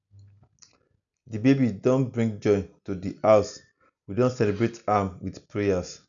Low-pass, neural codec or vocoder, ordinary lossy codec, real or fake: 7.2 kHz; none; none; real